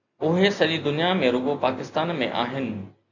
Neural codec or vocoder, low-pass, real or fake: none; 7.2 kHz; real